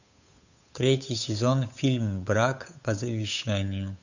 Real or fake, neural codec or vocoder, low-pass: fake; codec, 16 kHz, 8 kbps, FunCodec, trained on Chinese and English, 25 frames a second; 7.2 kHz